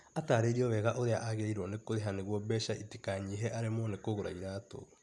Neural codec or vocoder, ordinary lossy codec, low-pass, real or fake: none; none; none; real